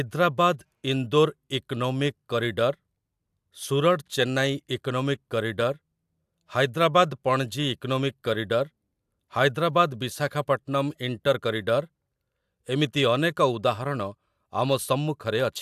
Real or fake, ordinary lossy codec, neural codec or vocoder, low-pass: real; AAC, 96 kbps; none; 14.4 kHz